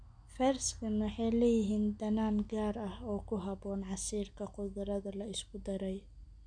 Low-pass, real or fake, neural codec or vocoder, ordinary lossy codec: 9.9 kHz; real; none; none